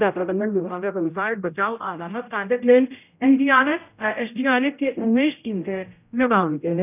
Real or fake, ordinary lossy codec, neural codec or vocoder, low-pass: fake; none; codec, 16 kHz, 0.5 kbps, X-Codec, HuBERT features, trained on general audio; 3.6 kHz